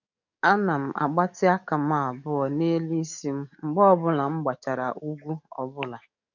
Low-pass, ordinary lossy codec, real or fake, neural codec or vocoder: 7.2 kHz; none; fake; codec, 44.1 kHz, 7.8 kbps, DAC